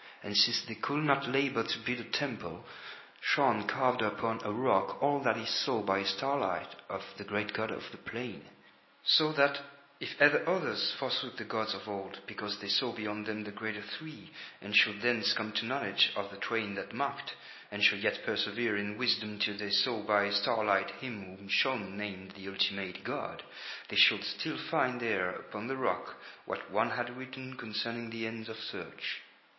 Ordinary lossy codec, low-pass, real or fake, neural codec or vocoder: MP3, 24 kbps; 7.2 kHz; real; none